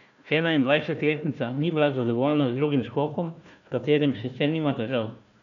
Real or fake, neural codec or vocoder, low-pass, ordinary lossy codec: fake; codec, 16 kHz, 1 kbps, FunCodec, trained on Chinese and English, 50 frames a second; 7.2 kHz; none